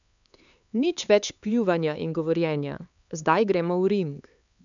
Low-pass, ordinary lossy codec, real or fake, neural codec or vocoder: 7.2 kHz; none; fake; codec, 16 kHz, 4 kbps, X-Codec, HuBERT features, trained on LibriSpeech